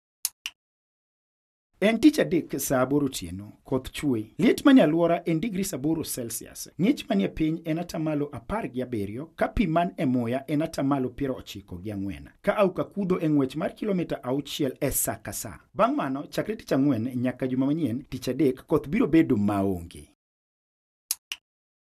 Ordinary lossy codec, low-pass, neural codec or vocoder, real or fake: none; 14.4 kHz; none; real